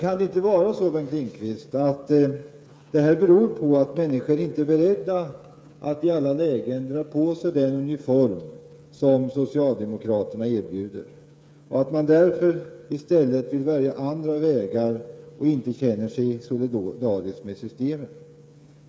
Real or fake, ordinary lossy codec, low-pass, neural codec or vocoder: fake; none; none; codec, 16 kHz, 8 kbps, FreqCodec, smaller model